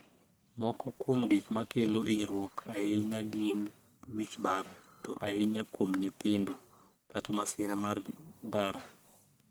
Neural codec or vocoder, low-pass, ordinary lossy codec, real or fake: codec, 44.1 kHz, 1.7 kbps, Pupu-Codec; none; none; fake